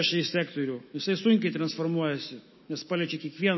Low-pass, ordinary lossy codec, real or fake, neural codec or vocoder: 7.2 kHz; MP3, 24 kbps; fake; autoencoder, 48 kHz, 128 numbers a frame, DAC-VAE, trained on Japanese speech